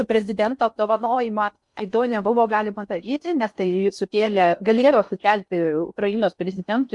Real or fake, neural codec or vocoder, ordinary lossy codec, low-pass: fake; codec, 16 kHz in and 24 kHz out, 0.6 kbps, FocalCodec, streaming, 4096 codes; MP3, 64 kbps; 10.8 kHz